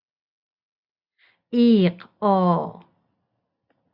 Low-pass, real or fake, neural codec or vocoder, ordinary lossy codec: 5.4 kHz; real; none; Opus, 64 kbps